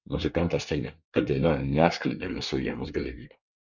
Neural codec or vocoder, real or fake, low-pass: codec, 24 kHz, 1 kbps, SNAC; fake; 7.2 kHz